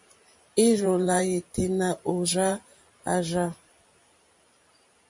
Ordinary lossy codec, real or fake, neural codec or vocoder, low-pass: MP3, 48 kbps; fake; vocoder, 44.1 kHz, 128 mel bands every 512 samples, BigVGAN v2; 10.8 kHz